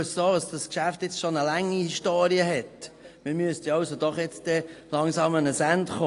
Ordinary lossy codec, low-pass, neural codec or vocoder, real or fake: AAC, 48 kbps; 10.8 kHz; none; real